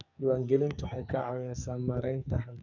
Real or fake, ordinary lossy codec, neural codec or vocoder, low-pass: fake; none; codec, 44.1 kHz, 2.6 kbps, SNAC; 7.2 kHz